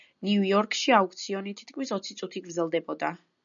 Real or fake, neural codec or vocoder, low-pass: real; none; 7.2 kHz